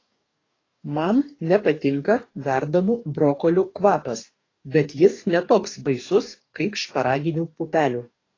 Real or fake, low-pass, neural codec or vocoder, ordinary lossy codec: fake; 7.2 kHz; codec, 44.1 kHz, 2.6 kbps, DAC; AAC, 32 kbps